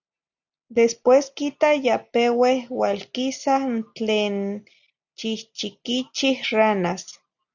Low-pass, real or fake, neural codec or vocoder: 7.2 kHz; real; none